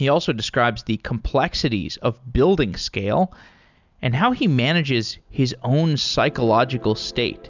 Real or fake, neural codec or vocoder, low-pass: real; none; 7.2 kHz